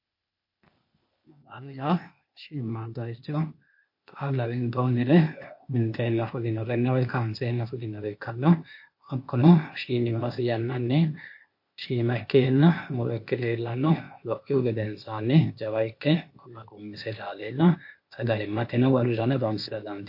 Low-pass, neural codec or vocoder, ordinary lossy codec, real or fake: 5.4 kHz; codec, 16 kHz, 0.8 kbps, ZipCodec; MP3, 32 kbps; fake